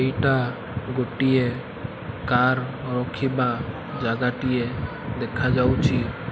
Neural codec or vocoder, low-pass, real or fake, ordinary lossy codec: none; none; real; none